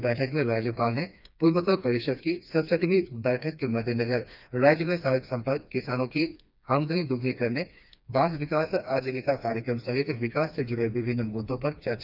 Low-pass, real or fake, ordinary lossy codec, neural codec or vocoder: 5.4 kHz; fake; none; codec, 16 kHz, 2 kbps, FreqCodec, smaller model